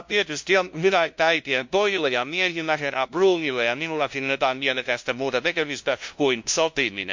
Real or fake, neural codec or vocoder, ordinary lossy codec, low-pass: fake; codec, 16 kHz, 0.5 kbps, FunCodec, trained on LibriTTS, 25 frames a second; MP3, 48 kbps; 7.2 kHz